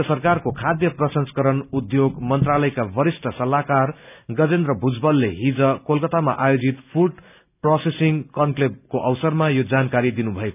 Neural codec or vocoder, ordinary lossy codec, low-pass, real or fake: none; none; 3.6 kHz; real